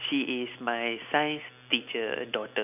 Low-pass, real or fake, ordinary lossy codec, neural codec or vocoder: 3.6 kHz; real; none; none